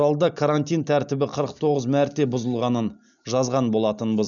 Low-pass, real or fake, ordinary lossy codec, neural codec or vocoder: 7.2 kHz; real; none; none